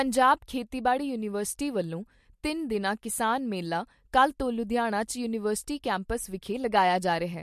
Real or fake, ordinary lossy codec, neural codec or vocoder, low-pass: real; MP3, 64 kbps; none; 14.4 kHz